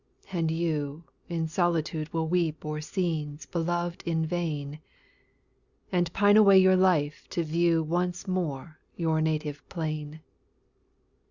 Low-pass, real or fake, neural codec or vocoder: 7.2 kHz; real; none